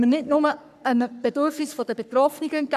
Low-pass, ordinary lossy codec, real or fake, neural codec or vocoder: 14.4 kHz; none; fake; codec, 44.1 kHz, 3.4 kbps, Pupu-Codec